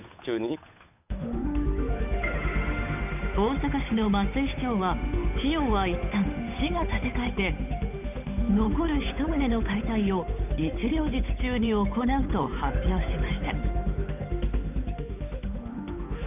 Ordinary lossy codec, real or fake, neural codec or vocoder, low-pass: none; fake; codec, 16 kHz, 8 kbps, FunCodec, trained on Chinese and English, 25 frames a second; 3.6 kHz